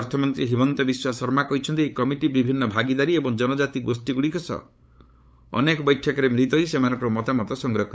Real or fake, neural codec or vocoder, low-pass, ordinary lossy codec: fake; codec, 16 kHz, 8 kbps, FunCodec, trained on LibriTTS, 25 frames a second; none; none